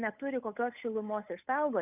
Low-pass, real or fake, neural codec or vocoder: 3.6 kHz; fake; codec, 16 kHz, 8 kbps, FunCodec, trained on Chinese and English, 25 frames a second